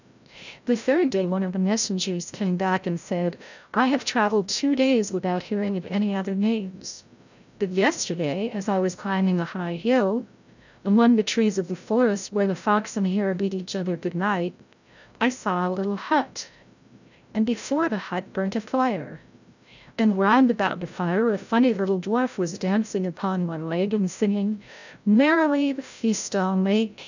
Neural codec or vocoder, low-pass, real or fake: codec, 16 kHz, 0.5 kbps, FreqCodec, larger model; 7.2 kHz; fake